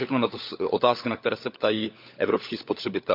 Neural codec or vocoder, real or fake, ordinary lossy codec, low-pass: codec, 16 kHz, 16 kbps, FunCodec, trained on LibriTTS, 50 frames a second; fake; MP3, 48 kbps; 5.4 kHz